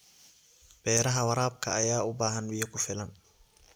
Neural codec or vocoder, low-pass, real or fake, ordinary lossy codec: none; none; real; none